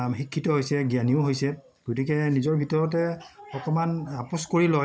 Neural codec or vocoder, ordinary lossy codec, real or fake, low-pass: none; none; real; none